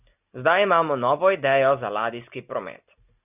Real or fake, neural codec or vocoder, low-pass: real; none; 3.6 kHz